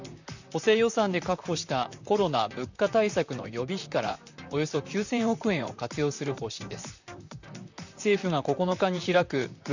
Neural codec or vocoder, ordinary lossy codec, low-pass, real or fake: vocoder, 44.1 kHz, 128 mel bands, Pupu-Vocoder; none; 7.2 kHz; fake